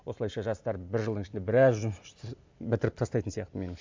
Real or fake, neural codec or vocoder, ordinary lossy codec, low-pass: real; none; MP3, 64 kbps; 7.2 kHz